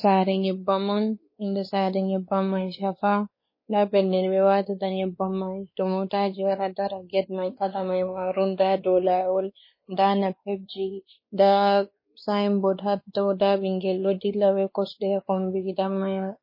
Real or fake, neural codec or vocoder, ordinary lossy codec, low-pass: fake; codec, 16 kHz, 2 kbps, X-Codec, WavLM features, trained on Multilingual LibriSpeech; MP3, 24 kbps; 5.4 kHz